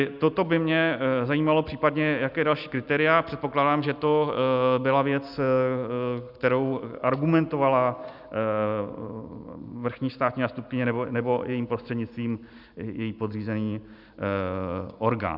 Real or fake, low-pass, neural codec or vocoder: real; 5.4 kHz; none